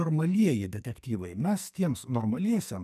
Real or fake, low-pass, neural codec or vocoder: fake; 14.4 kHz; codec, 44.1 kHz, 2.6 kbps, SNAC